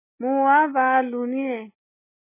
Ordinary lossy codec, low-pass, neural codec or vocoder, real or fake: MP3, 16 kbps; 3.6 kHz; none; real